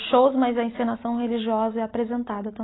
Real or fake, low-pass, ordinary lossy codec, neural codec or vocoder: real; 7.2 kHz; AAC, 16 kbps; none